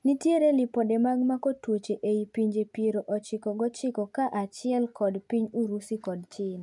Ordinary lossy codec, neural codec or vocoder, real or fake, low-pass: none; none; real; 10.8 kHz